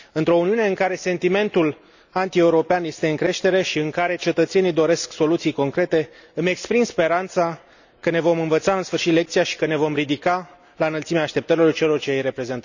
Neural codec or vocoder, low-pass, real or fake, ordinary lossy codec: none; 7.2 kHz; real; none